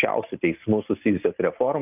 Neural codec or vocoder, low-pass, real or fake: none; 3.6 kHz; real